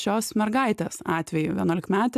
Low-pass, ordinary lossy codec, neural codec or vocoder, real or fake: 14.4 kHz; AAC, 96 kbps; none; real